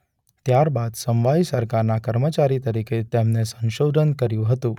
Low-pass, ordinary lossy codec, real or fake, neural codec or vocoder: 19.8 kHz; none; real; none